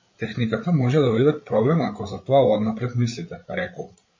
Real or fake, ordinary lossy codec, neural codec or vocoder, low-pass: fake; MP3, 32 kbps; codec, 16 kHz, 8 kbps, FreqCodec, larger model; 7.2 kHz